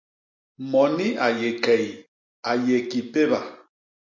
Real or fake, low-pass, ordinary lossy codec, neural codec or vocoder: real; 7.2 kHz; MP3, 48 kbps; none